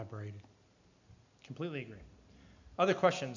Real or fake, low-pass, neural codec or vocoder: real; 7.2 kHz; none